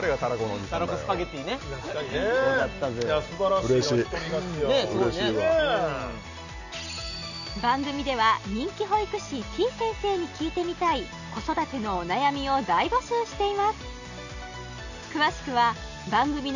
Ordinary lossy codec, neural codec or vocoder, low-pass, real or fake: none; none; 7.2 kHz; real